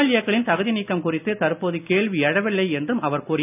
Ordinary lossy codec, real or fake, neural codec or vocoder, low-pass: none; real; none; 3.6 kHz